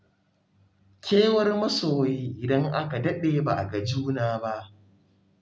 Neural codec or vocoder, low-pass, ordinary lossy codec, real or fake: none; none; none; real